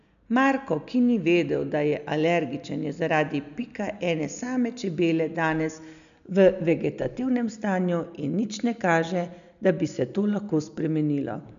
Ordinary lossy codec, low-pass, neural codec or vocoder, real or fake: MP3, 96 kbps; 7.2 kHz; none; real